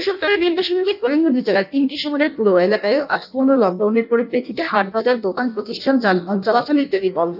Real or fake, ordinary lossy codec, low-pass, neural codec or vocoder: fake; none; 5.4 kHz; codec, 16 kHz in and 24 kHz out, 0.6 kbps, FireRedTTS-2 codec